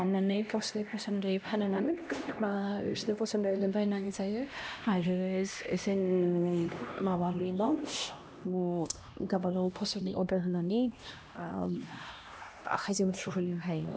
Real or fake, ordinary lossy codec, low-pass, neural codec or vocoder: fake; none; none; codec, 16 kHz, 1 kbps, X-Codec, HuBERT features, trained on LibriSpeech